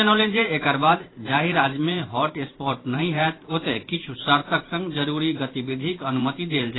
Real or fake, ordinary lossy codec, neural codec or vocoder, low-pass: fake; AAC, 16 kbps; vocoder, 44.1 kHz, 128 mel bands every 512 samples, BigVGAN v2; 7.2 kHz